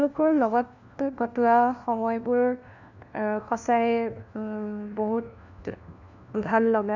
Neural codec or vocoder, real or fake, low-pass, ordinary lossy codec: codec, 16 kHz, 1 kbps, FunCodec, trained on LibriTTS, 50 frames a second; fake; 7.2 kHz; none